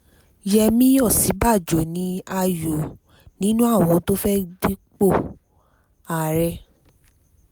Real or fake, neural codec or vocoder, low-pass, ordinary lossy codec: real; none; none; none